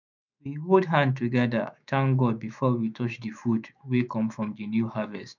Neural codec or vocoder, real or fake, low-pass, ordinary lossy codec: autoencoder, 48 kHz, 128 numbers a frame, DAC-VAE, trained on Japanese speech; fake; 7.2 kHz; none